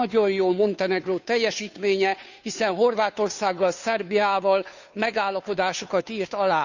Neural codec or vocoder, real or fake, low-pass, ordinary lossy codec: codec, 16 kHz, 2 kbps, FunCodec, trained on Chinese and English, 25 frames a second; fake; 7.2 kHz; none